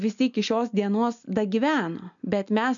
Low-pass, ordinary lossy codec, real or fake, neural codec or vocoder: 7.2 kHz; MP3, 96 kbps; real; none